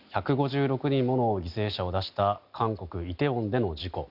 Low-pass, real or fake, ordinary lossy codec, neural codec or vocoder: 5.4 kHz; real; none; none